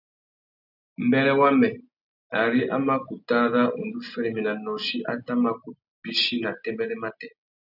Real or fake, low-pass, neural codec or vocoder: real; 5.4 kHz; none